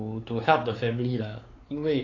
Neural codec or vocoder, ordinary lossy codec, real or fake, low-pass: codec, 16 kHz, 4 kbps, X-Codec, WavLM features, trained on Multilingual LibriSpeech; AAC, 32 kbps; fake; 7.2 kHz